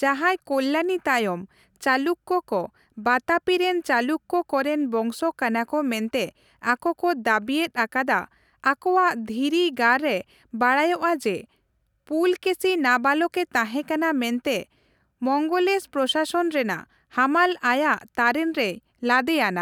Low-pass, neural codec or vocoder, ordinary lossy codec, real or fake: 19.8 kHz; none; none; real